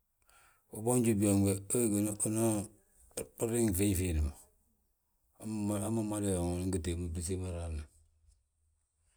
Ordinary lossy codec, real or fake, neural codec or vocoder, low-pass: none; real; none; none